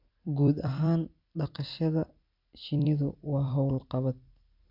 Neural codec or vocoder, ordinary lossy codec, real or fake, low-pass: vocoder, 44.1 kHz, 128 mel bands every 256 samples, BigVGAN v2; none; fake; 5.4 kHz